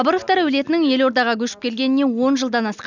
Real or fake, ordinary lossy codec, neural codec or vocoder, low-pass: real; none; none; 7.2 kHz